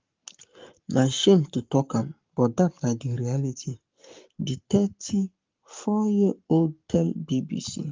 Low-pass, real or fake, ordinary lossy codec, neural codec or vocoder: 7.2 kHz; fake; Opus, 32 kbps; codec, 44.1 kHz, 7.8 kbps, Pupu-Codec